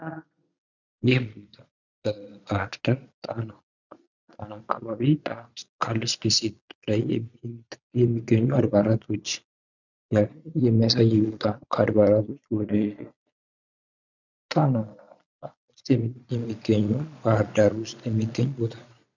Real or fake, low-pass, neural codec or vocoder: fake; 7.2 kHz; vocoder, 22.05 kHz, 80 mel bands, Vocos